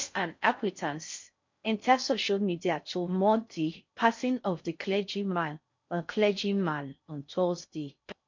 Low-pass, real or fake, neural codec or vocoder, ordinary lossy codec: 7.2 kHz; fake; codec, 16 kHz in and 24 kHz out, 0.6 kbps, FocalCodec, streaming, 4096 codes; MP3, 48 kbps